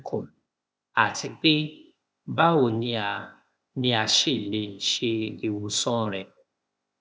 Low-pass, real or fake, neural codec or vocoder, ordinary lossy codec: none; fake; codec, 16 kHz, 0.8 kbps, ZipCodec; none